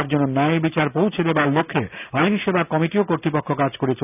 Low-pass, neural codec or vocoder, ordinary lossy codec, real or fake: 3.6 kHz; none; none; real